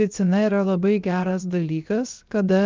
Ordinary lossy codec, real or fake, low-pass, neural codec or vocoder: Opus, 32 kbps; fake; 7.2 kHz; codec, 16 kHz, 0.7 kbps, FocalCodec